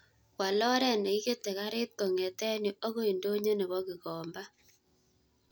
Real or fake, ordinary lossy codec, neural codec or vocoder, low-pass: real; none; none; none